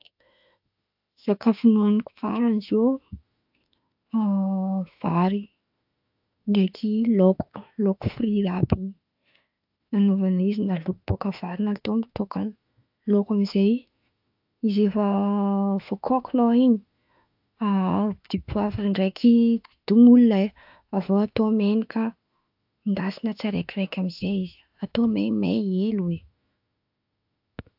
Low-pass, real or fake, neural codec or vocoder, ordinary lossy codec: 5.4 kHz; fake; autoencoder, 48 kHz, 32 numbers a frame, DAC-VAE, trained on Japanese speech; AAC, 48 kbps